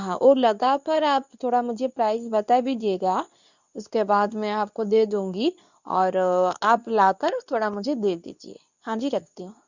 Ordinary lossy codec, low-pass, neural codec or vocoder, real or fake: none; 7.2 kHz; codec, 24 kHz, 0.9 kbps, WavTokenizer, medium speech release version 2; fake